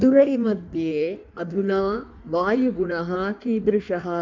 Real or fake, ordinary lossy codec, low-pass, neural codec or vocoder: fake; MP3, 64 kbps; 7.2 kHz; codec, 16 kHz in and 24 kHz out, 1.1 kbps, FireRedTTS-2 codec